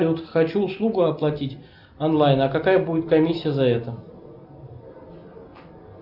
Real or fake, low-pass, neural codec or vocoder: real; 5.4 kHz; none